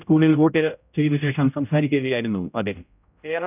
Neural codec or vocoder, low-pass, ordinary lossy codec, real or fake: codec, 16 kHz, 0.5 kbps, X-Codec, HuBERT features, trained on general audio; 3.6 kHz; none; fake